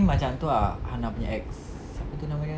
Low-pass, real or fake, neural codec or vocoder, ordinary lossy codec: none; real; none; none